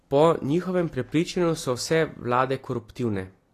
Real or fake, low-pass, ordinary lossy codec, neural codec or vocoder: real; 14.4 kHz; AAC, 48 kbps; none